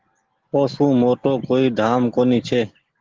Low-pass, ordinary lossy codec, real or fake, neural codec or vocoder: 7.2 kHz; Opus, 16 kbps; real; none